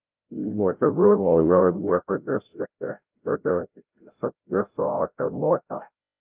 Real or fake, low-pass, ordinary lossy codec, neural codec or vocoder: fake; 3.6 kHz; Opus, 32 kbps; codec, 16 kHz, 0.5 kbps, FreqCodec, larger model